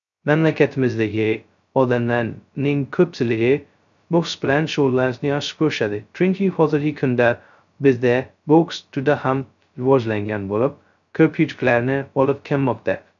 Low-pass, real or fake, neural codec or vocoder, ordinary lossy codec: 7.2 kHz; fake; codec, 16 kHz, 0.2 kbps, FocalCodec; none